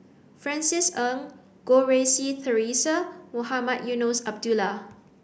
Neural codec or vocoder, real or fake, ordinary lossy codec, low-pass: none; real; none; none